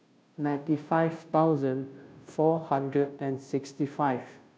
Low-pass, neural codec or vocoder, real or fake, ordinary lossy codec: none; codec, 16 kHz, 0.5 kbps, FunCodec, trained on Chinese and English, 25 frames a second; fake; none